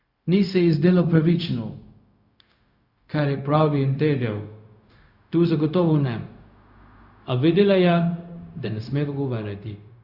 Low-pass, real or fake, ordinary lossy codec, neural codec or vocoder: 5.4 kHz; fake; Opus, 64 kbps; codec, 16 kHz, 0.4 kbps, LongCat-Audio-Codec